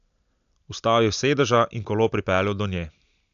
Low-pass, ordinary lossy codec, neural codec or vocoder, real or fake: 7.2 kHz; AAC, 96 kbps; none; real